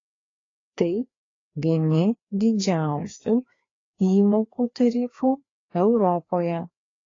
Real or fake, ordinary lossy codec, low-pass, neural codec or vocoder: fake; AAC, 32 kbps; 7.2 kHz; codec, 16 kHz, 2 kbps, FreqCodec, larger model